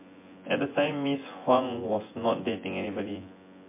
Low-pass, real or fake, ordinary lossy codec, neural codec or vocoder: 3.6 kHz; fake; MP3, 24 kbps; vocoder, 24 kHz, 100 mel bands, Vocos